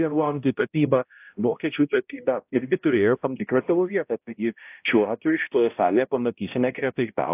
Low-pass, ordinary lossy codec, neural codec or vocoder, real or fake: 3.6 kHz; AAC, 32 kbps; codec, 16 kHz, 0.5 kbps, X-Codec, HuBERT features, trained on balanced general audio; fake